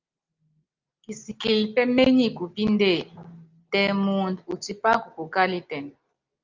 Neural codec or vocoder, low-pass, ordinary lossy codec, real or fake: none; 7.2 kHz; Opus, 24 kbps; real